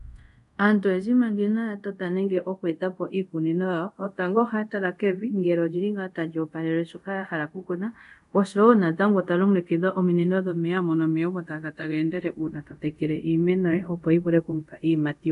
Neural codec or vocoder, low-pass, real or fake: codec, 24 kHz, 0.5 kbps, DualCodec; 10.8 kHz; fake